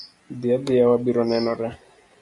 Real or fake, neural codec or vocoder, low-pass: real; none; 10.8 kHz